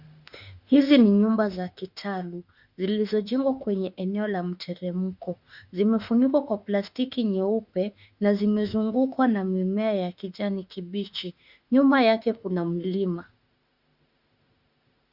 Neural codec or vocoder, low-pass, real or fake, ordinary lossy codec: autoencoder, 48 kHz, 32 numbers a frame, DAC-VAE, trained on Japanese speech; 5.4 kHz; fake; Opus, 64 kbps